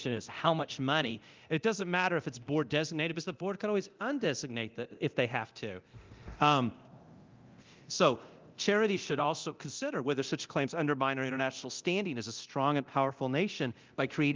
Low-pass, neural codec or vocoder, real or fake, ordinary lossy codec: 7.2 kHz; codec, 24 kHz, 0.9 kbps, DualCodec; fake; Opus, 24 kbps